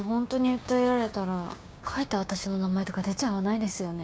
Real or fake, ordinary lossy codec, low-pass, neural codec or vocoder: fake; none; none; codec, 16 kHz, 6 kbps, DAC